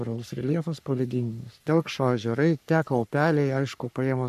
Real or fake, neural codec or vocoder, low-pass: fake; codec, 44.1 kHz, 3.4 kbps, Pupu-Codec; 14.4 kHz